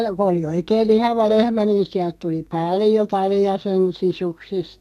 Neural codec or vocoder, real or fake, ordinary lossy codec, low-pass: codec, 32 kHz, 1.9 kbps, SNAC; fake; none; 14.4 kHz